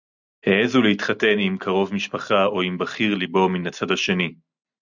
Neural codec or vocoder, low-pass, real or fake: none; 7.2 kHz; real